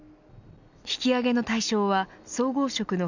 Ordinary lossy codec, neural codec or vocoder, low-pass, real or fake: none; none; 7.2 kHz; real